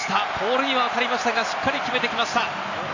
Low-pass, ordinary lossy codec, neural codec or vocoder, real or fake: 7.2 kHz; AAC, 48 kbps; none; real